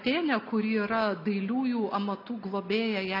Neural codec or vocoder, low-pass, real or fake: none; 5.4 kHz; real